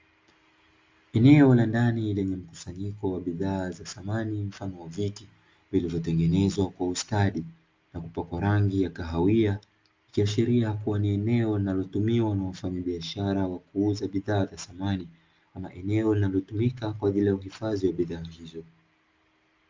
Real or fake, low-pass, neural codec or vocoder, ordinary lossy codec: real; 7.2 kHz; none; Opus, 32 kbps